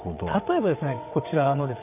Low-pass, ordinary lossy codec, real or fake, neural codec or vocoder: 3.6 kHz; none; fake; vocoder, 22.05 kHz, 80 mel bands, WaveNeXt